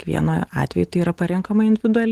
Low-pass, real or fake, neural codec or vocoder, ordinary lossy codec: 14.4 kHz; real; none; Opus, 16 kbps